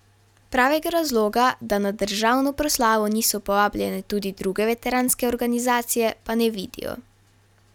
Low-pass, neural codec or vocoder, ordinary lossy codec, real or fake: 19.8 kHz; none; none; real